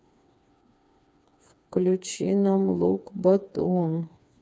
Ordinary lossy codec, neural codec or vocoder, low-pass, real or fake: none; codec, 16 kHz, 4 kbps, FreqCodec, smaller model; none; fake